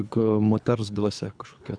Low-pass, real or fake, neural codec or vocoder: 9.9 kHz; fake; codec, 24 kHz, 3 kbps, HILCodec